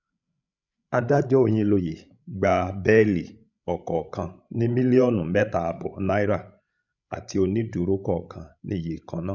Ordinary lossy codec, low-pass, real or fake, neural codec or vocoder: none; 7.2 kHz; fake; codec, 16 kHz, 16 kbps, FreqCodec, larger model